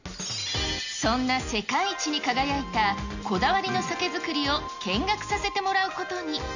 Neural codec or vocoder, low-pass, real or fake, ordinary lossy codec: none; 7.2 kHz; real; none